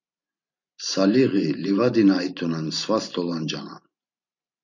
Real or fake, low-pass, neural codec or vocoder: fake; 7.2 kHz; vocoder, 44.1 kHz, 128 mel bands every 512 samples, BigVGAN v2